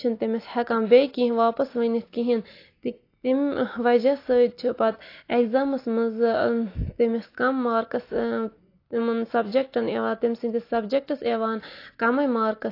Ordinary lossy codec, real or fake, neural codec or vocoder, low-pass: AAC, 32 kbps; real; none; 5.4 kHz